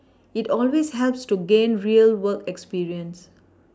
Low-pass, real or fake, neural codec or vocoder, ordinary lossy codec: none; real; none; none